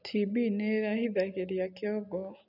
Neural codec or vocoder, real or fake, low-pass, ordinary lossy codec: none; real; 5.4 kHz; none